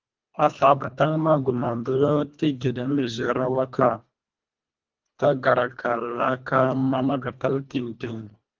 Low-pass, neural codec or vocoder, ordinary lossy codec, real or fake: 7.2 kHz; codec, 24 kHz, 1.5 kbps, HILCodec; Opus, 24 kbps; fake